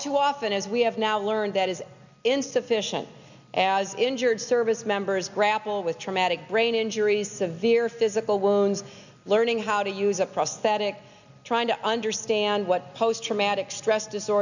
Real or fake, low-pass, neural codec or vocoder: real; 7.2 kHz; none